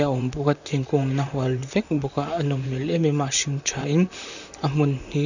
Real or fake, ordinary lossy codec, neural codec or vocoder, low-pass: fake; none; vocoder, 44.1 kHz, 128 mel bands, Pupu-Vocoder; 7.2 kHz